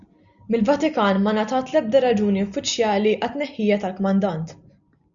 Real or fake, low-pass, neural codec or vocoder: real; 7.2 kHz; none